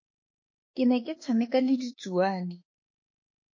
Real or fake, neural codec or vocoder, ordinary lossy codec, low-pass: fake; autoencoder, 48 kHz, 32 numbers a frame, DAC-VAE, trained on Japanese speech; MP3, 32 kbps; 7.2 kHz